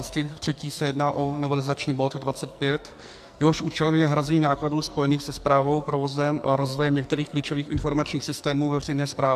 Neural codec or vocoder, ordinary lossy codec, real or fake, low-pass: codec, 44.1 kHz, 2.6 kbps, SNAC; AAC, 96 kbps; fake; 14.4 kHz